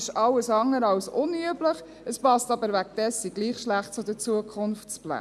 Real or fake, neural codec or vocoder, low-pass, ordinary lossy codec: real; none; none; none